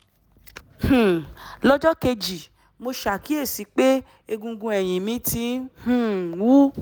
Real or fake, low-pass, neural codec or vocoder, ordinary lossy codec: real; none; none; none